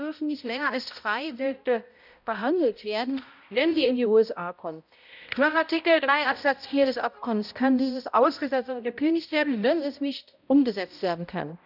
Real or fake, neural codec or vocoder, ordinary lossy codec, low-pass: fake; codec, 16 kHz, 0.5 kbps, X-Codec, HuBERT features, trained on balanced general audio; none; 5.4 kHz